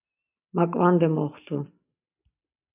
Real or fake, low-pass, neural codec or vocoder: real; 3.6 kHz; none